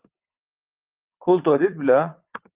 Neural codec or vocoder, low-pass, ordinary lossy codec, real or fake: vocoder, 22.05 kHz, 80 mel bands, WaveNeXt; 3.6 kHz; Opus, 32 kbps; fake